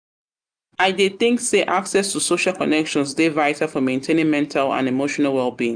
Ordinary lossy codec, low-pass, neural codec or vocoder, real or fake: none; 9.9 kHz; none; real